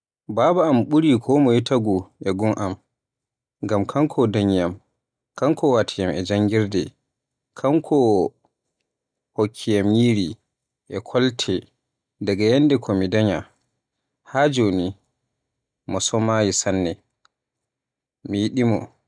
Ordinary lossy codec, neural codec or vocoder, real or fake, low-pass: none; none; real; 9.9 kHz